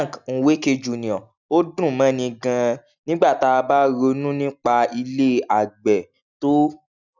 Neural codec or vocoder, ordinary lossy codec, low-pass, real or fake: none; none; 7.2 kHz; real